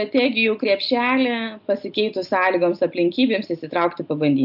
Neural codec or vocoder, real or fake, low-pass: none; real; 5.4 kHz